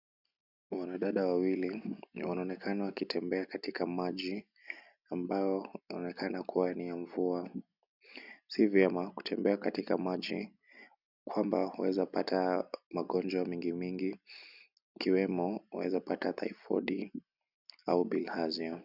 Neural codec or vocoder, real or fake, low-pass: none; real; 5.4 kHz